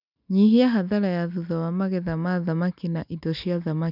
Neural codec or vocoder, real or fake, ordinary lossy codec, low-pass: none; real; none; 5.4 kHz